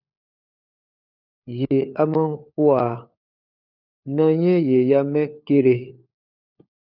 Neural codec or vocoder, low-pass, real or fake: codec, 16 kHz, 4 kbps, FunCodec, trained on LibriTTS, 50 frames a second; 5.4 kHz; fake